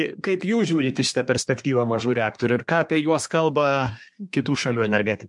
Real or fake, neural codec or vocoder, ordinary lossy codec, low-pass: fake; codec, 24 kHz, 1 kbps, SNAC; MP3, 64 kbps; 10.8 kHz